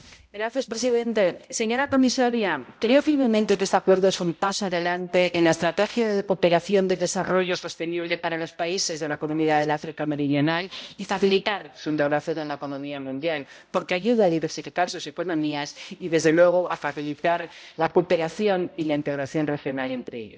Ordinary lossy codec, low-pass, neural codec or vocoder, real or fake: none; none; codec, 16 kHz, 0.5 kbps, X-Codec, HuBERT features, trained on balanced general audio; fake